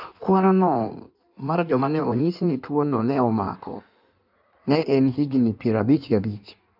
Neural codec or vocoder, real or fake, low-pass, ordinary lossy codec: codec, 16 kHz in and 24 kHz out, 1.1 kbps, FireRedTTS-2 codec; fake; 5.4 kHz; none